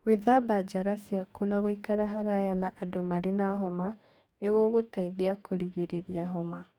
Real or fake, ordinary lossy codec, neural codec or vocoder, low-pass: fake; none; codec, 44.1 kHz, 2.6 kbps, DAC; 19.8 kHz